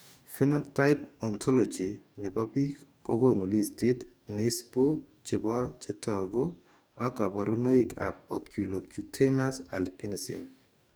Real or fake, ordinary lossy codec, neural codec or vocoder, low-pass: fake; none; codec, 44.1 kHz, 2.6 kbps, DAC; none